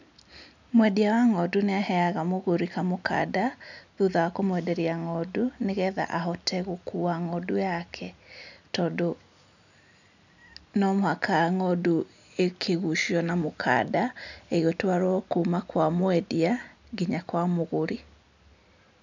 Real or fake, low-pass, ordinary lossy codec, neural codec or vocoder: real; 7.2 kHz; none; none